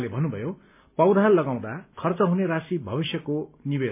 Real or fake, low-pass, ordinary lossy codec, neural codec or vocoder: real; 3.6 kHz; MP3, 24 kbps; none